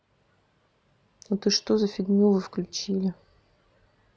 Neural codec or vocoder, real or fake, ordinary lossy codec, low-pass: none; real; none; none